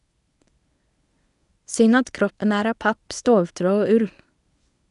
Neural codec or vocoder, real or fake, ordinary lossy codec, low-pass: codec, 24 kHz, 0.9 kbps, WavTokenizer, medium speech release version 1; fake; none; 10.8 kHz